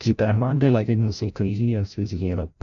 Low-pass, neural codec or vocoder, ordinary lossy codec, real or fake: 7.2 kHz; codec, 16 kHz, 0.5 kbps, FreqCodec, larger model; none; fake